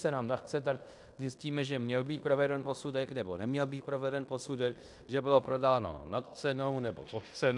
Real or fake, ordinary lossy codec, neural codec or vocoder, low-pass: fake; MP3, 96 kbps; codec, 16 kHz in and 24 kHz out, 0.9 kbps, LongCat-Audio-Codec, fine tuned four codebook decoder; 10.8 kHz